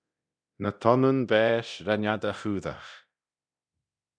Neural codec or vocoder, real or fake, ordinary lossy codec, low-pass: codec, 24 kHz, 0.9 kbps, DualCodec; fake; MP3, 96 kbps; 9.9 kHz